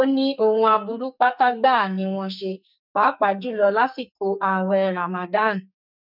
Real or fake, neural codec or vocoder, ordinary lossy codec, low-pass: fake; codec, 44.1 kHz, 2.6 kbps, SNAC; none; 5.4 kHz